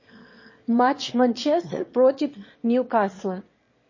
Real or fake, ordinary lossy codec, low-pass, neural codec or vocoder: fake; MP3, 32 kbps; 7.2 kHz; autoencoder, 22.05 kHz, a latent of 192 numbers a frame, VITS, trained on one speaker